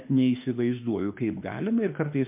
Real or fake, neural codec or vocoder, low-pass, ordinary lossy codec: fake; autoencoder, 48 kHz, 32 numbers a frame, DAC-VAE, trained on Japanese speech; 3.6 kHz; MP3, 32 kbps